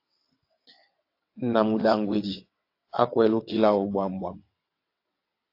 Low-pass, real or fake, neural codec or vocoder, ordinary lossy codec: 5.4 kHz; fake; vocoder, 22.05 kHz, 80 mel bands, WaveNeXt; AAC, 32 kbps